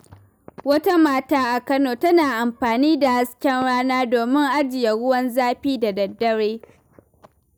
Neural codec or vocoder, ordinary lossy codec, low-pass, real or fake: none; none; none; real